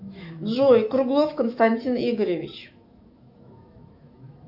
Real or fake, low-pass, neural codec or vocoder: fake; 5.4 kHz; autoencoder, 48 kHz, 128 numbers a frame, DAC-VAE, trained on Japanese speech